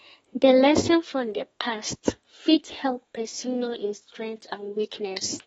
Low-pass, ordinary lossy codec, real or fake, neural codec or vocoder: 14.4 kHz; AAC, 24 kbps; fake; codec, 32 kHz, 1.9 kbps, SNAC